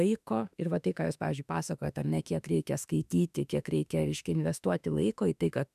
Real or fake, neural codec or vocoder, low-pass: fake; autoencoder, 48 kHz, 32 numbers a frame, DAC-VAE, trained on Japanese speech; 14.4 kHz